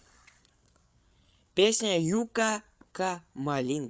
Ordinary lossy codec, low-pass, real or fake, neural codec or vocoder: none; none; fake; codec, 16 kHz, 8 kbps, FreqCodec, larger model